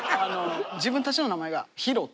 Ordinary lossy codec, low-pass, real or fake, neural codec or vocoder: none; none; real; none